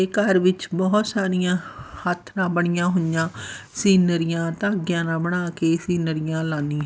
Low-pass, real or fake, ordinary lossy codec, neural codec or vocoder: none; real; none; none